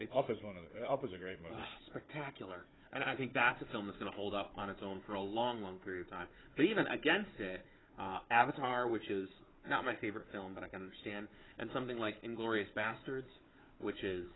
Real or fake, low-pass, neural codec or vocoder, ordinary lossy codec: fake; 7.2 kHz; codec, 16 kHz, 4 kbps, FunCodec, trained on Chinese and English, 50 frames a second; AAC, 16 kbps